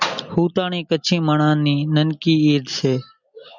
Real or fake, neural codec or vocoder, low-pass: real; none; 7.2 kHz